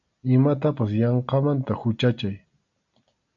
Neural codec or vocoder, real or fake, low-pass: none; real; 7.2 kHz